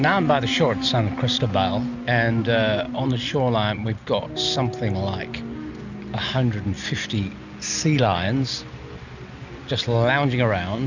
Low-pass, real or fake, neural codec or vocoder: 7.2 kHz; real; none